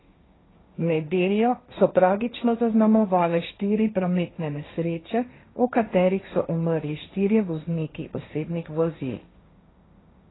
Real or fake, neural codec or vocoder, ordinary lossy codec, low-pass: fake; codec, 16 kHz, 1.1 kbps, Voila-Tokenizer; AAC, 16 kbps; 7.2 kHz